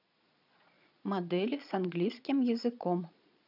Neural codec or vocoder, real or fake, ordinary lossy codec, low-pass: vocoder, 22.05 kHz, 80 mel bands, Vocos; fake; none; 5.4 kHz